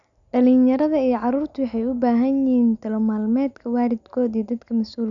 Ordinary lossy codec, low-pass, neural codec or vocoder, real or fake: none; 7.2 kHz; none; real